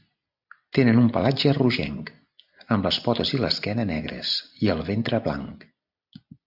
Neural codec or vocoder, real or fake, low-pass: vocoder, 44.1 kHz, 128 mel bands every 256 samples, BigVGAN v2; fake; 5.4 kHz